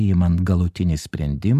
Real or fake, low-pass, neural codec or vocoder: real; 14.4 kHz; none